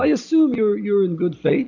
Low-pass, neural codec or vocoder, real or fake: 7.2 kHz; none; real